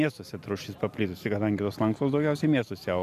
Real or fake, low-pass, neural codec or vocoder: real; 14.4 kHz; none